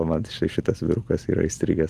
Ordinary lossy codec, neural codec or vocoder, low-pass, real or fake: Opus, 32 kbps; none; 14.4 kHz; real